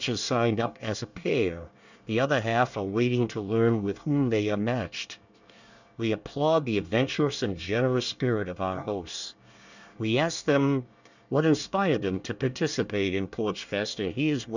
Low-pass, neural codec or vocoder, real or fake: 7.2 kHz; codec, 24 kHz, 1 kbps, SNAC; fake